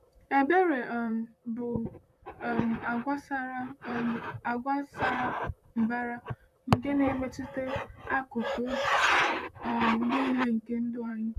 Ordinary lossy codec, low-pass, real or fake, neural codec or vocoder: none; 14.4 kHz; fake; vocoder, 44.1 kHz, 128 mel bands, Pupu-Vocoder